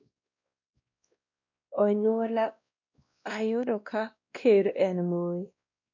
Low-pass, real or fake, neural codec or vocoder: 7.2 kHz; fake; codec, 16 kHz, 1 kbps, X-Codec, WavLM features, trained on Multilingual LibriSpeech